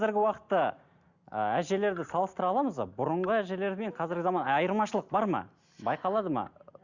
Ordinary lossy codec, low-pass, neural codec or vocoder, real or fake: Opus, 64 kbps; 7.2 kHz; none; real